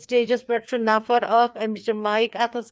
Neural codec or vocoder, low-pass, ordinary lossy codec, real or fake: codec, 16 kHz, 2 kbps, FreqCodec, larger model; none; none; fake